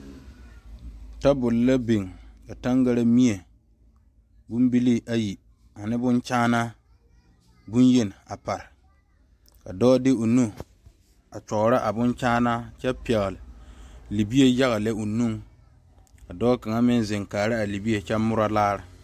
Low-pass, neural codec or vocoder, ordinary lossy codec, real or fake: 14.4 kHz; none; AAC, 96 kbps; real